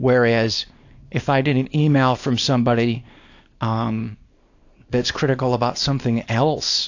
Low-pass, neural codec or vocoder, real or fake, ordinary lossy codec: 7.2 kHz; codec, 24 kHz, 0.9 kbps, WavTokenizer, small release; fake; AAC, 48 kbps